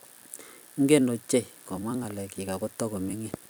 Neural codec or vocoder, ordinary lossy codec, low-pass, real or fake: vocoder, 44.1 kHz, 128 mel bands every 256 samples, BigVGAN v2; none; none; fake